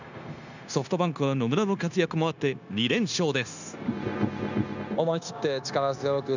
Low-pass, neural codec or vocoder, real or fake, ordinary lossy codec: 7.2 kHz; codec, 16 kHz, 0.9 kbps, LongCat-Audio-Codec; fake; none